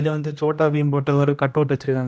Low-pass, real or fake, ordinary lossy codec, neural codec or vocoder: none; fake; none; codec, 16 kHz, 1 kbps, X-Codec, HuBERT features, trained on general audio